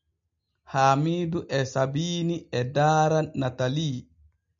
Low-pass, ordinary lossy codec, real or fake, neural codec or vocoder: 7.2 kHz; MP3, 64 kbps; real; none